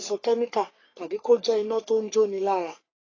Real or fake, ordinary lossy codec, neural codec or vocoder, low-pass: fake; AAC, 32 kbps; codec, 44.1 kHz, 3.4 kbps, Pupu-Codec; 7.2 kHz